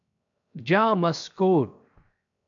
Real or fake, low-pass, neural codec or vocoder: fake; 7.2 kHz; codec, 16 kHz, 0.7 kbps, FocalCodec